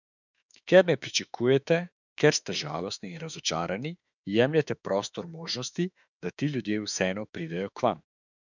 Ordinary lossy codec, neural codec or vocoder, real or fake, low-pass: none; autoencoder, 48 kHz, 32 numbers a frame, DAC-VAE, trained on Japanese speech; fake; 7.2 kHz